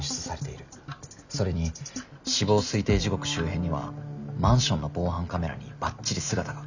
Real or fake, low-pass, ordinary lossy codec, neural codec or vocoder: real; 7.2 kHz; none; none